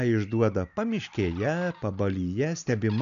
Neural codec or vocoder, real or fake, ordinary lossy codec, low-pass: none; real; MP3, 96 kbps; 7.2 kHz